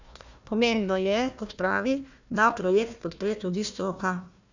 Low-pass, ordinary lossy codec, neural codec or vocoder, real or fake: 7.2 kHz; none; codec, 16 kHz, 1 kbps, FunCodec, trained on Chinese and English, 50 frames a second; fake